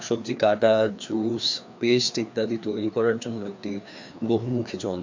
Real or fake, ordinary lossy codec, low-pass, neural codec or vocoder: fake; MP3, 64 kbps; 7.2 kHz; codec, 16 kHz, 2 kbps, FreqCodec, larger model